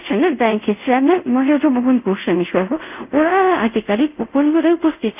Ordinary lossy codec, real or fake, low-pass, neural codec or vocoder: none; fake; 3.6 kHz; codec, 24 kHz, 0.5 kbps, DualCodec